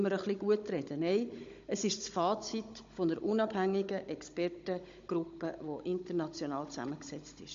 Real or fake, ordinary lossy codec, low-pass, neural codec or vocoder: fake; MP3, 48 kbps; 7.2 kHz; codec, 16 kHz, 16 kbps, FunCodec, trained on Chinese and English, 50 frames a second